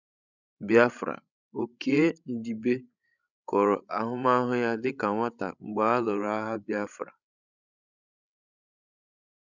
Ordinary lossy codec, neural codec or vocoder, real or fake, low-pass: none; codec, 16 kHz, 16 kbps, FreqCodec, larger model; fake; 7.2 kHz